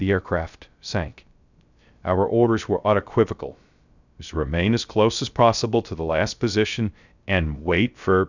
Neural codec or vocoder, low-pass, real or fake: codec, 16 kHz, 0.3 kbps, FocalCodec; 7.2 kHz; fake